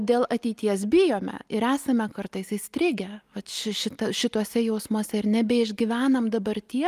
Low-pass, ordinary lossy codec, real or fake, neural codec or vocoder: 14.4 kHz; Opus, 32 kbps; real; none